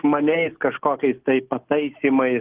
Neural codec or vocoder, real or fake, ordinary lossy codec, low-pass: none; real; Opus, 16 kbps; 3.6 kHz